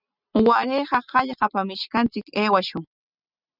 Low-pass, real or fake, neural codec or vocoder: 5.4 kHz; real; none